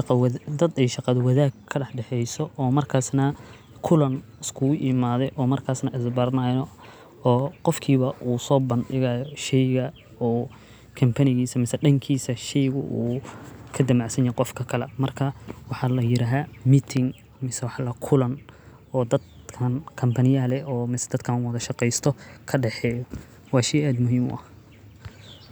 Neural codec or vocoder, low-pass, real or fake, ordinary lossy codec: none; none; real; none